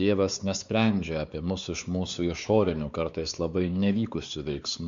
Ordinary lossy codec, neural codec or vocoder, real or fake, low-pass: Opus, 64 kbps; codec, 16 kHz, 4 kbps, X-Codec, WavLM features, trained on Multilingual LibriSpeech; fake; 7.2 kHz